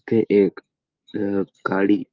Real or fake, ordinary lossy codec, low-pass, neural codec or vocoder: fake; Opus, 24 kbps; 7.2 kHz; codec, 16 kHz, 6 kbps, DAC